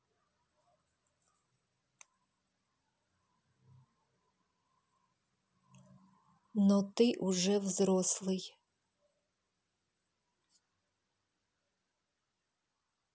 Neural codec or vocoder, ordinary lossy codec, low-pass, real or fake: none; none; none; real